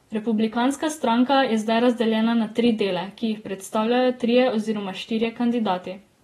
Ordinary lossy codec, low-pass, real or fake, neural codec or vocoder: AAC, 32 kbps; 19.8 kHz; real; none